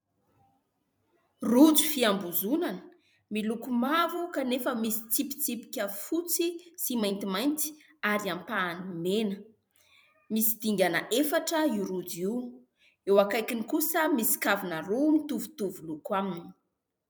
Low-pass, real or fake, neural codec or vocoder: 19.8 kHz; fake; vocoder, 44.1 kHz, 128 mel bands every 256 samples, BigVGAN v2